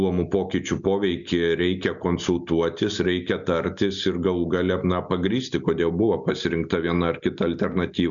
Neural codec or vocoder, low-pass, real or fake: none; 7.2 kHz; real